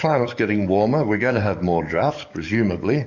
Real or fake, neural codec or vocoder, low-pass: fake; codec, 44.1 kHz, 7.8 kbps, DAC; 7.2 kHz